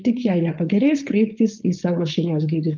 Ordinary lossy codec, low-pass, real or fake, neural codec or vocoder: Opus, 32 kbps; 7.2 kHz; fake; codec, 16 kHz, 4.8 kbps, FACodec